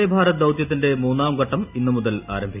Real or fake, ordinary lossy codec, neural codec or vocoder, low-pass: real; none; none; 3.6 kHz